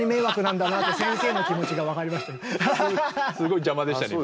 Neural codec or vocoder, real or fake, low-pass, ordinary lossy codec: none; real; none; none